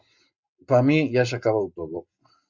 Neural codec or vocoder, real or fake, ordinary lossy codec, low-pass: none; real; Opus, 64 kbps; 7.2 kHz